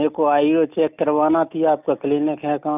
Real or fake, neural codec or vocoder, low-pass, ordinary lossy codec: real; none; 3.6 kHz; none